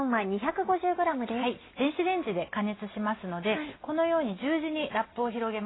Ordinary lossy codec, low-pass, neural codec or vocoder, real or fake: AAC, 16 kbps; 7.2 kHz; none; real